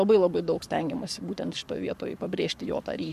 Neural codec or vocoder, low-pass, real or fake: codec, 44.1 kHz, 7.8 kbps, Pupu-Codec; 14.4 kHz; fake